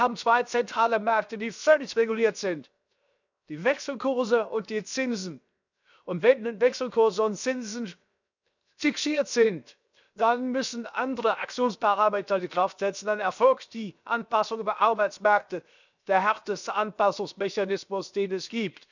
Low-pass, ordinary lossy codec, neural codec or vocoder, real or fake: 7.2 kHz; none; codec, 16 kHz, 0.7 kbps, FocalCodec; fake